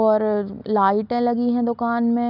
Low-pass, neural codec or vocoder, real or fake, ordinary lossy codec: 5.4 kHz; none; real; none